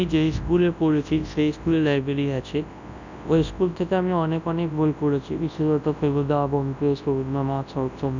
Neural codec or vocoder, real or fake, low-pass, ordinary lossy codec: codec, 24 kHz, 0.9 kbps, WavTokenizer, large speech release; fake; 7.2 kHz; none